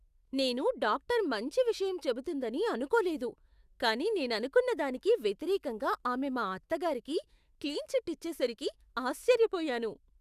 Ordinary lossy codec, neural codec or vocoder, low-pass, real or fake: Opus, 64 kbps; autoencoder, 48 kHz, 128 numbers a frame, DAC-VAE, trained on Japanese speech; 14.4 kHz; fake